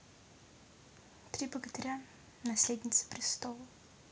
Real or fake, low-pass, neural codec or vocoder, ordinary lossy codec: real; none; none; none